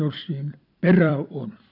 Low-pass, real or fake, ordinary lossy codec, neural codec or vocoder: 5.4 kHz; fake; none; vocoder, 44.1 kHz, 128 mel bands every 256 samples, BigVGAN v2